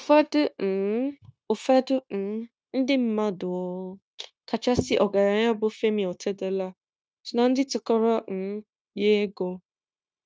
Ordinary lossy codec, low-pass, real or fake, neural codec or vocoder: none; none; fake; codec, 16 kHz, 0.9 kbps, LongCat-Audio-Codec